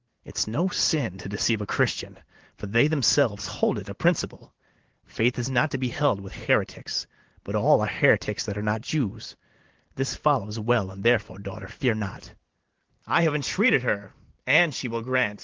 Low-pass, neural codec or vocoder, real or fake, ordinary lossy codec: 7.2 kHz; vocoder, 44.1 kHz, 128 mel bands every 512 samples, BigVGAN v2; fake; Opus, 16 kbps